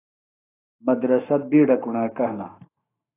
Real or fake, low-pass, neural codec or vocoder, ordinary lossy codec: fake; 3.6 kHz; codec, 44.1 kHz, 7.8 kbps, Pupu-Codec; AAC, 16 kbps